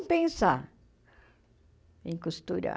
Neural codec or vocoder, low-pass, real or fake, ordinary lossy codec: none; none; real; none